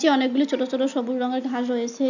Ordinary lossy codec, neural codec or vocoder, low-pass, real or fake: none; none; 7.2 kHz; real